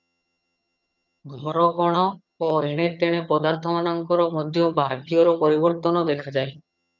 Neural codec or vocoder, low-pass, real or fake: vocoder, 22.05 kHz, 80 mel bands, HiFi-GAN; 7.2 kHz; fake